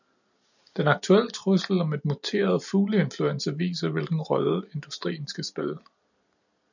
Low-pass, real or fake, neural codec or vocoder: 7.2 kHz; real; none